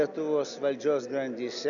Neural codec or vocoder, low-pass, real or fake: none; 7.2 kHz; real